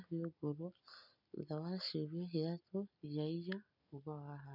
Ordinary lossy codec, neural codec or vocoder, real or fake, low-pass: none; none; real; 5.4 kHz